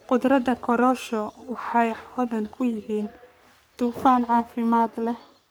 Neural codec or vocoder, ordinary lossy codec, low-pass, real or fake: codec, 44.1 kHz, 3.4 kbps, Pupu-Codec; none; none; fake